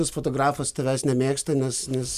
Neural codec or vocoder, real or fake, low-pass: none; real; 14.4 kHz